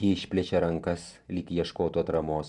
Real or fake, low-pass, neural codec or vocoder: fake; 10.8 kHz; vocoder, 44.1 kHz, 128 mel bands every 512 samples, BigVGAN v2